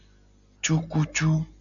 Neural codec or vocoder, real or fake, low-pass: none; real; 7.2 kHz